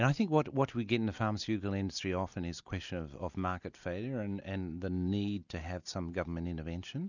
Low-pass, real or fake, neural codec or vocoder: 7.2 kHz; real; none